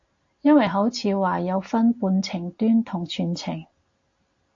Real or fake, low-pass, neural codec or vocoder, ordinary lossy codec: real; 7.2 kHz; none; AAC, 48 kbps